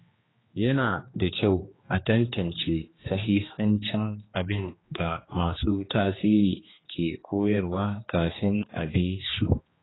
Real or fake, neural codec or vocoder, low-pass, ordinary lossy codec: fake; codec, 16 kHz, 2 kbps, X-Codec, HuBERT features, trained on general audio; 7.2 kHz; AAC, 16 kbps